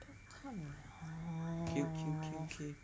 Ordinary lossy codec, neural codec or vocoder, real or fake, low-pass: none; none; real; none